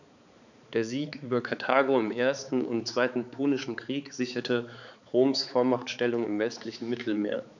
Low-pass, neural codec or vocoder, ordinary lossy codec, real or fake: 7.2 kHz; codec, 16 kHz, 4 kbps, X-Codec, HuBERT features, trained on balanced general audio; none; fake